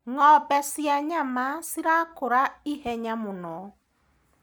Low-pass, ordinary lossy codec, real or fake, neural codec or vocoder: none; none; real; none